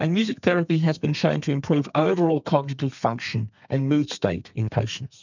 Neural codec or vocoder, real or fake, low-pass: codec, 32 kHz, 1.9 kbps, SNAC; fake; 7.2 kHz